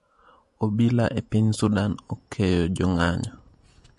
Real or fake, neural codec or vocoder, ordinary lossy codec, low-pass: fake; vocoder, 48 kHz, 128 mel bands, Vocos; MP3, 48 kbps; 14.4 kHz